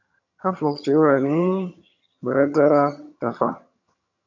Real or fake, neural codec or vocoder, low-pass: fake; vocoder, 22.05 kHz, 80 mel bands, HiFi-GAN; 7.2 kHz